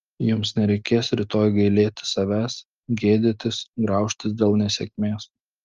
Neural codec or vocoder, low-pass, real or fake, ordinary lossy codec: none; 7.2 kHz; real; Opus, 32 kbps